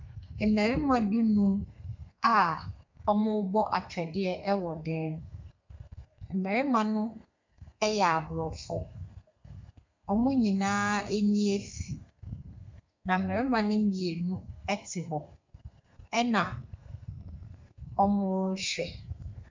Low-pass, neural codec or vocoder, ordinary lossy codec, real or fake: 7.2 kHz; codec, 32 kHz, 1.9 kbps, SNAC; MP3, 64 kbps; fake